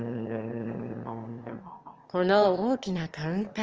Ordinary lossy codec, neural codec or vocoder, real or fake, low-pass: Opus, 24 kbps; autoencoder, 22.05 kHz, a latent of 192 numbers a frame, VITS, trained on one speaker; fake; 7.2 kHz